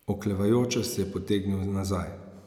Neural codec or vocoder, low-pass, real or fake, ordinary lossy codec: none; 19.8 kHz; real; none